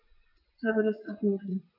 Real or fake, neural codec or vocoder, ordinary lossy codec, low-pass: fake; vocoder, 22.05 kHz, 80 mel bands, WaveNeXt; none; 5.4 kHz